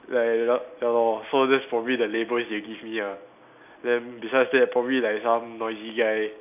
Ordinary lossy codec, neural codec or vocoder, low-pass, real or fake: none; none; 3.6 kHz; real